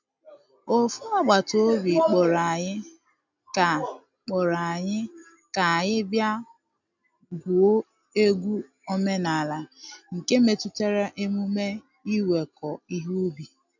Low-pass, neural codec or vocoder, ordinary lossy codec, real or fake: 7.2 kHz; none; none; real